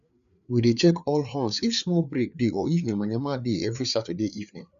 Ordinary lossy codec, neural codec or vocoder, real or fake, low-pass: none; codec, 16 kHz, 4 kbps, FreqCodec, larger model; fake; 7.2 kHz